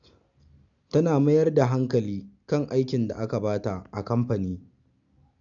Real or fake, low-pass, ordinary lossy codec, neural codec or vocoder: real; 7.2 kHz; none; none